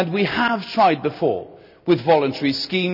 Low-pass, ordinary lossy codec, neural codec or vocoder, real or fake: 5.4 kHz; none; none; real